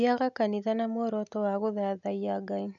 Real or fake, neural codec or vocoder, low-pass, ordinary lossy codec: real; none; 7.2 kHz; none